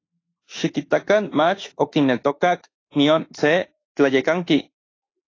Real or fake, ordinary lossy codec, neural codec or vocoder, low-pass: fake; AAC, 32 kbps; autoencoder, 48 kHz, 32 numbers a frame, DAC-VAE, trained on Japanese speech; 7.2 kHz